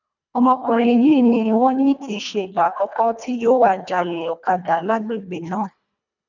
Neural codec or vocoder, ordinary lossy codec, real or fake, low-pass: codec, 24 kHz, 1.5 kbps, HILCodec; none; fake; 7.2 kHz